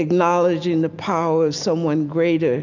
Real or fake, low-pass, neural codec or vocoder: real; 7.2 kHz; none